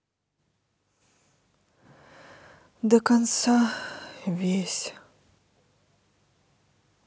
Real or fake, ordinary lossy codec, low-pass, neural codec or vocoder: real; none; none; none